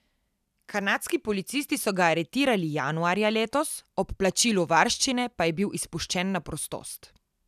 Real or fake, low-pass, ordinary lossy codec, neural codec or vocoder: real; 14.4 kHz; none; none